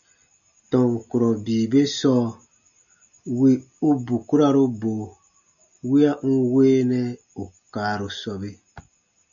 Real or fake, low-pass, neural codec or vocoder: real; 7.2 kHz; none